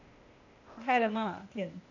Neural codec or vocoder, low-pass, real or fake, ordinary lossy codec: codec, 16 kHz, 0.8 kbps, ZipCodec; 7.2 kHz; fake; none